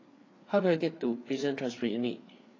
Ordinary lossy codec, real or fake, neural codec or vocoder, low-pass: AAC, 32 kbps; fake; codec, 16 kHz, 4 kbps, FreqCodec, larger model; 7.2 kHz